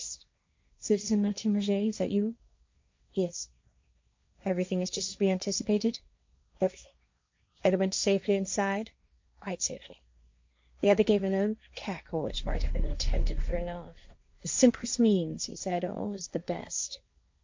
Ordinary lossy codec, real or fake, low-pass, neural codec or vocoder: MP3, 64 kbps; fake; 7.2 kHz; codec, 16 kHz, 1.1 kbps, Voila-Tokenizer